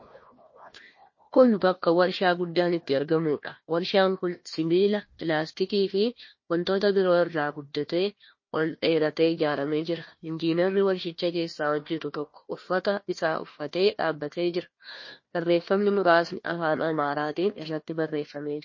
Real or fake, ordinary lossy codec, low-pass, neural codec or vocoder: fake; MP3, 32 kbps; 7.2 kHz; codec, 16 kHz, 1 kbps, FunCodec, trained on Chinese and English, 50 frames a second